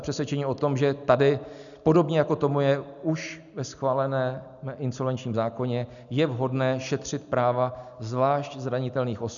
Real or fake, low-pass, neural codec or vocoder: real; 7.2 kHz; none